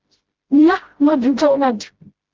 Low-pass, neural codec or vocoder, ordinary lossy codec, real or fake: 7.2 kHz; codec, 16 kHz, 0.5 kbps, FreqCodec, smaller model; Opus, 16 kbps; fake